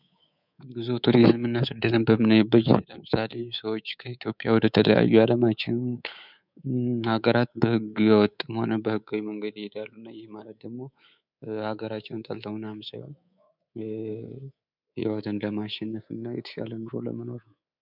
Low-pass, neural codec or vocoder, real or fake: 5.4 kHz; codec, 24 kHz, 3.1 kbps, DualCodec; fake